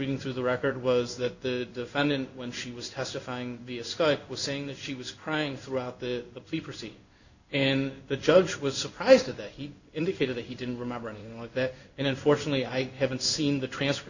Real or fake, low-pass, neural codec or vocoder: fake; 7.2 kHz; codec, 16 kHz in and 24 kHz out, 1 kbps, XY-Tokenizer